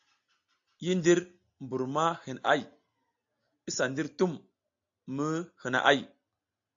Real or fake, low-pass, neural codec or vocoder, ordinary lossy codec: real; 7.2 kHz; none; AAC, 64 kbps